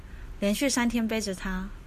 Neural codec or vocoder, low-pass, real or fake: none; 14.4 kHz; real